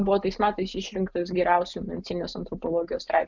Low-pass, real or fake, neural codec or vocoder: 7.2 kHz; real; none